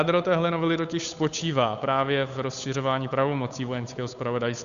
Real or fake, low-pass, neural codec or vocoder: fake; 7.2 kHz; codec, 16 kHz, 8 kbps, FunCodec, trained on Chinese and English, 25 frames a second